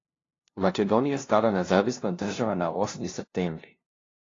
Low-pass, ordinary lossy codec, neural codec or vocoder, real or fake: 7.2 kHz; AAC, 32 kbps; codec, 16 kHz, 0.5 kbps, FunCodec, trained on LibriTTS, 25 frames a second; fake